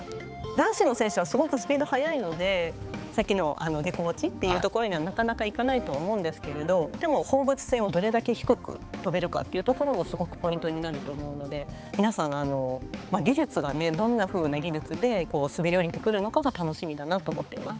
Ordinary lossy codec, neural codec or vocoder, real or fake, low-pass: none; codec, 16 kHz, 4 kbps, X-Codec, HuBERT features, trained on balanced general audio; fake; none